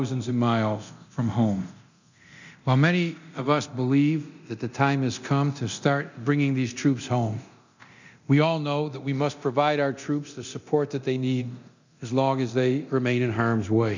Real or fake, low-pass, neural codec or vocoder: fake; 7.2 kHz; codec, 24 kHz, 0.9 kbps, DualCodec